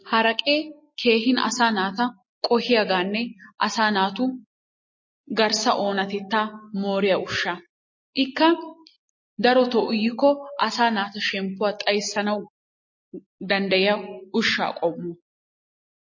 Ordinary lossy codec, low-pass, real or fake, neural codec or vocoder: MP3, 32 kbps; 7.2 kHz; real; none